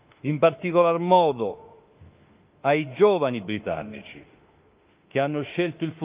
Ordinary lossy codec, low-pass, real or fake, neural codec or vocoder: Opus, 24 kbps; 3.6 kHz; fake; autoencoder, 48 kHz, 32 numbers a frame, DAC-VAE, trained on Japanese speech